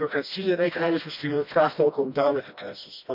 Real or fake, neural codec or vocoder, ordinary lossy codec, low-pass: fake; codec, 16 kHz, 1 kbps, FreqCodec, smaller model; MP3, 48 kbps; 5.4 kHz